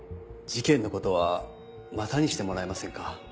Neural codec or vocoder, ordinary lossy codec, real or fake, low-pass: none; none; real; none